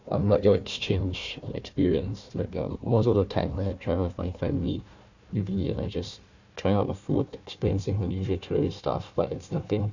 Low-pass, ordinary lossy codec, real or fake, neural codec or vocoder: 7.2 kHz; none; fake; codec, 16 kHz, 1 kbps, FunCodec, trained on Chinese and English, 50 frames a second